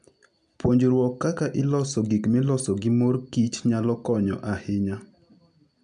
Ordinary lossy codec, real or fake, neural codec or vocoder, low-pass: none; real; none; 9.9 kHz